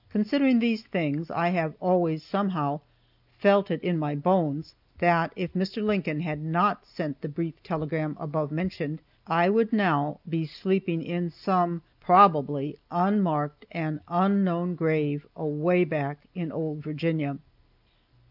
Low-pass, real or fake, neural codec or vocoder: 5.4 kHz; real; none